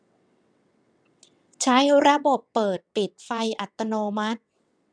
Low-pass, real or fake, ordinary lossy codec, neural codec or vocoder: 9.9 kHz; fake; none; vocoder, 22.05 kHz, 80 mel bands, WaveNeXt